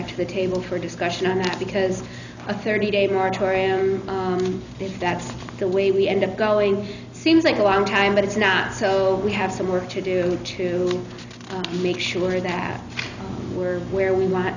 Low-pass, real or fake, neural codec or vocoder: 7.2 kHz; real; none